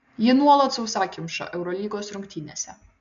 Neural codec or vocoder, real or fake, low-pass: none; real; 7.2 kHz